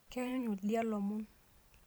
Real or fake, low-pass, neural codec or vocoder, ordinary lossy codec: fake; none; vocoder, 44.1 kHz, 128 mel bands every 512 samples, BigVGAN v2; none